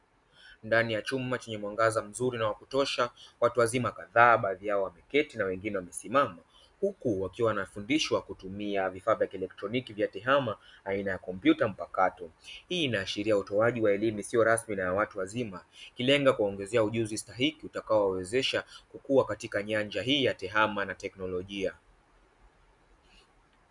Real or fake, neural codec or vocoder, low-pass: real; none; 10.8 kHz